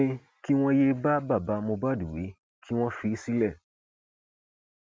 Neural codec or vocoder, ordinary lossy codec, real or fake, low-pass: none; none; real; none